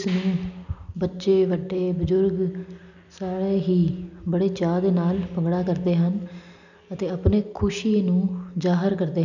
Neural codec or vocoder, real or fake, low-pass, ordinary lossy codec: none; real; 7.2 kHz; none